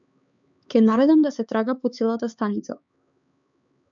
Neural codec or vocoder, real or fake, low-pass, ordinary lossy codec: codec, 16 kHz, 4 kbps, X-Codec, HuBERT features, trained on LibriSpeech; fake; 7.2 kHz; MP3, 96 kbps